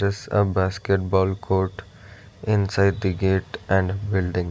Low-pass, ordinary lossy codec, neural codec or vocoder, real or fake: none; none; none; real